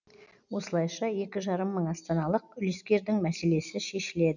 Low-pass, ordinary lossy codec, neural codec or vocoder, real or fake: 7.2 kHz; none; none; real